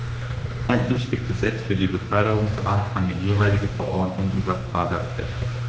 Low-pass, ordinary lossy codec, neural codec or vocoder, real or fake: none; none; codec, 16 kHz, 2 kbps, X-Codec, HuBERT features, trained on general audio; fake